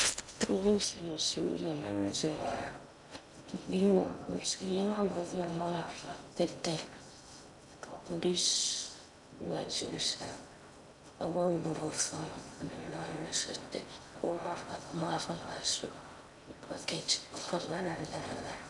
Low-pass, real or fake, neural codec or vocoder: 10.8 kHz; fake; codec, 16 kHz in and 24 kHz out, 0.6 kbps, FocalCodec, streaming, 2048 codes